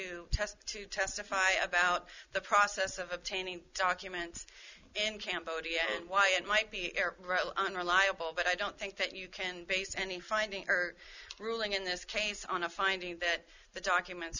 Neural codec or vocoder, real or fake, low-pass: none; real; 7.2 kHz